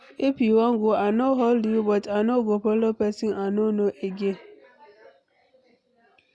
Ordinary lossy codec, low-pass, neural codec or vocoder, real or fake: none; none; none; real